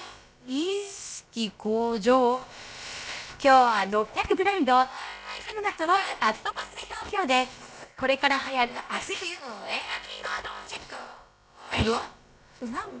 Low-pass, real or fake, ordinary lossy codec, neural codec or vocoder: none; fake; none; codec, 16 kHz, about 1 kbps, DyCAST, with the encoder's durations